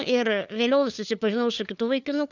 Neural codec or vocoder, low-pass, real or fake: codec, 16 kHz, 4 kbps, FunCodec, trained on LibriTTS, 50 frames a second; 7.2 kHz; fake